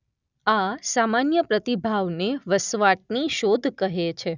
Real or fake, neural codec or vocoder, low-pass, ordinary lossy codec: real; none; 7.2 kHz; none